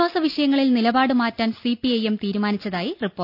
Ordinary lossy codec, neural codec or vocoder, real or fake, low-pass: none; none; real; 5.4 kHz